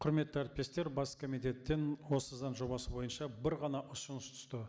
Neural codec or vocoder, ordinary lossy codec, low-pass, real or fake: none; none; none; real